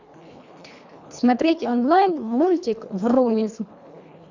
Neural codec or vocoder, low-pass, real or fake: codec, 24 kHz, 1.5 kbps, HILCodec; 7.2 kHz; fake